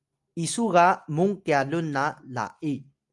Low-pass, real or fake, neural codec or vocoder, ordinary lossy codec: 10.8 kHz; real; none; Opus, 32 kbps